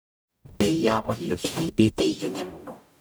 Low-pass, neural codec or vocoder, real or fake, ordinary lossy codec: none; codec, 44.1 kHz, 0.9 kbps, DAC; fake; none